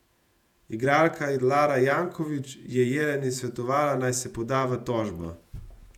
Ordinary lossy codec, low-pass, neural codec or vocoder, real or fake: none; 19.8 kHz; vocoder, 48 kHz, 128 mel bands, Vocos; fake